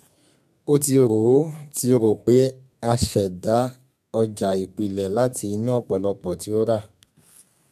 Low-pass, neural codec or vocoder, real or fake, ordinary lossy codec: 14.4 kHz; codec, 32 kHz, 1.9 kbps, SNAC; fake; none